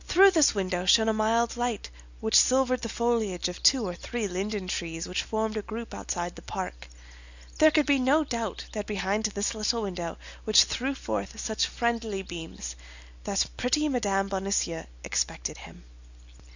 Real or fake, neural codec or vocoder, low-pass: real; none; 7.2 kHz